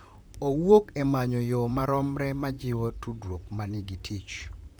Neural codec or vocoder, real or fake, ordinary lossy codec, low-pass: vocoder, 44.1 kHz, 128 mel bands, Pupu-Vocoder; fake; none; none